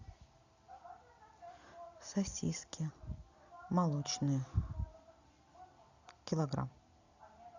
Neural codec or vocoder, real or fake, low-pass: none; real; 7.2 kHz